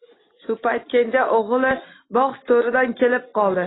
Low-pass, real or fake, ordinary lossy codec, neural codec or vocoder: 7.2 kHz; real; AAC, 16 kbps; none